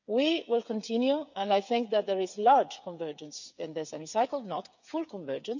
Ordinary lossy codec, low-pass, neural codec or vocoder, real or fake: none; 7.2 kHz; codec, 16 kHz, 8 kbps, FreqCodec, smaller model; fake